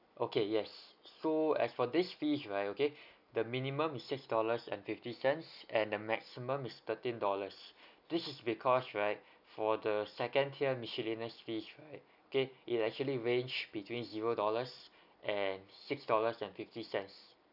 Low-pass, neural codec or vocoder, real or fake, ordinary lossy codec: 5.4 kHz; none; real; none